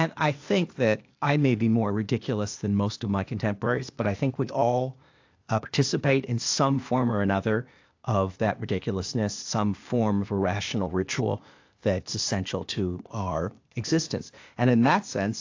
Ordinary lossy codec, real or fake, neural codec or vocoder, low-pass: AAC, 48 kbps; fake; codec, 16 kHz, 0.8 kbps, ZipCodec; 7.2 kHz